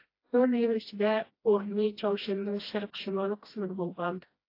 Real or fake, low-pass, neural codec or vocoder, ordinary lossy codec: fake; 5.4 kHz; codec, 16 kHz, 1 kbps, FreqCodec, smaller model; AAC, 32 kbps